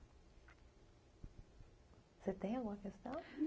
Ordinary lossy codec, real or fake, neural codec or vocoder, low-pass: none; real; none; none